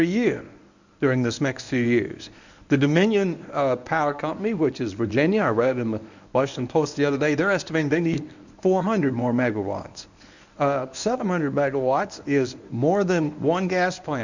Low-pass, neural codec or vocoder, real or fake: 7.2 kHz; codec, 24 kHz, 0.9 kbps, WavTokenizer, medium speech release version 1; fake